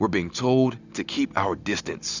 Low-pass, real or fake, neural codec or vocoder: 7.2 kHz; real; none